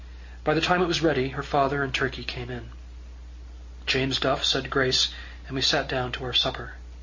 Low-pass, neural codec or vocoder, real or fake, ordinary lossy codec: 7.2 kHz; none; real; Opus, 64 kbps